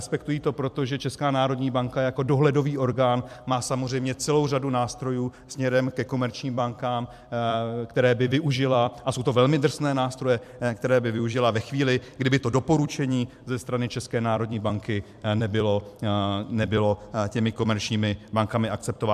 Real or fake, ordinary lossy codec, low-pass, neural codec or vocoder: fake; MP3, 96 kbps; 14.4 kHz; vocoder, 44.1 kHz, 128 mel bands every 256 samples, BigVGAN v2